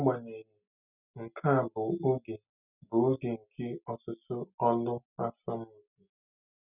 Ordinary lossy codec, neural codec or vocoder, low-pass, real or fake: none; none; 3.6 kHz; real